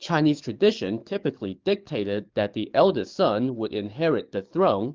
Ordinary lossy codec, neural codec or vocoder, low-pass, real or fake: Opus, 32 kbps; codec, 44.1 kHz, 7.8 kbps, DAC; 7.2 kHz; fake